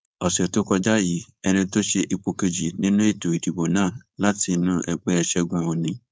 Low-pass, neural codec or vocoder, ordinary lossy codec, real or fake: none; codec, 16 kHz, 4.8 kbps, FACodec; none; fake